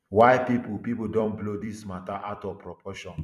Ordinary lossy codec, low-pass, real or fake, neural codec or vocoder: none; 14.4 kHz; fake; vocoder, 44.1 kHz, 128 mel bands every 256 samples, BigVGAN v2